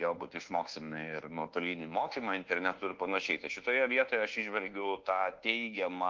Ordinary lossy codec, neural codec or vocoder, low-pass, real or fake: Opus, 16 kbps; codec, 24 kHz, 1.2 kbps, DualCodec; 7.2 kHz; fake